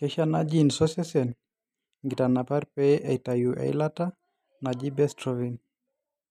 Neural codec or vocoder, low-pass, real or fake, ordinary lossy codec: none; 14.4 kHz; real; none